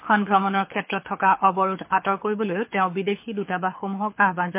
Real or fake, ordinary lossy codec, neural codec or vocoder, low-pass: fake; MP3, 24 kbps; codec, 24 kHz, 6 kbps, HILCodec; 3.6 kHz